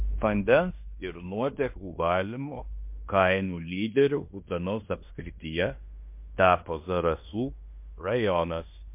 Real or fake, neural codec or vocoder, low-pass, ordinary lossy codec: fake; codec, 16 kHz in and 24 kHz out, 0.9 kbps, LongCat-Audio-Codec, fine tuned four codebook decoder; 3.6 kHz; MP3, 32 kbps